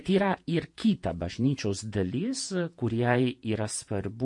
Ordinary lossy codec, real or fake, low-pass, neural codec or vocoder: MP3, 48 kbps; fake; 10.8 kHz; vocoder, 48 kHz, 128 mel bands, Vocos